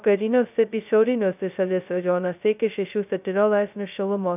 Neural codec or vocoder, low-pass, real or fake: codec, 16 kHz, 0.2 kbps, FocalCodec; 3.6 kHz; fake